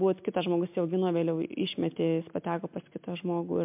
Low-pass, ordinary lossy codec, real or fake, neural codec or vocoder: 3.6 kHz; MP3, 32 kbps; real; none